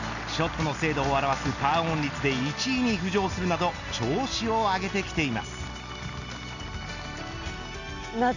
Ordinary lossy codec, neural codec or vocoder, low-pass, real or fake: none; none; 7.2 kHz; real